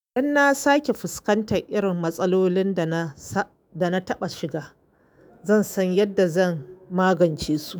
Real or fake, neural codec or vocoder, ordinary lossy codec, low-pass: fake; autoencoder, 48 kHz, 128 numbers a frame, DAC-VAE, trained on Japanese speech; none; none